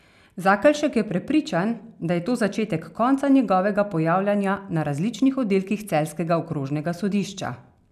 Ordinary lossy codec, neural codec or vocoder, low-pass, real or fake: none; none; 14.4 kHz; real